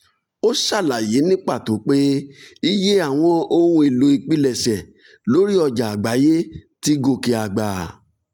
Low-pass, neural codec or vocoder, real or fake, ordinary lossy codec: 19.8 kHz; none; real; none